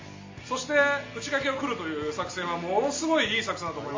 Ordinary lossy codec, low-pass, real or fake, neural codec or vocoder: MP3, 32 kbps; 7.2 kHz; real; none